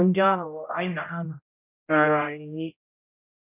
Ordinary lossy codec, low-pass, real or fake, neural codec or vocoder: none; 3.6 kHz; fake; codec, 16 kHz, 0.5 kbps, X-Codec, HuBERT features, trained on general audio